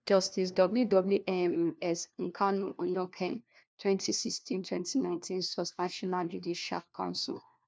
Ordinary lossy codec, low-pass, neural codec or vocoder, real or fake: none; none; codec, 16 kHz, 1 kbps, FunCodec, trained on LibriTTS, 50 frames a second; fake